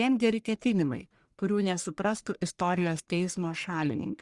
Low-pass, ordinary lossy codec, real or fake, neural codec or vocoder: 10.8 kHz; Opus, 64 kbps; fake; codec, 44.1 kHz, 1.7 kbps, Pupu-Codec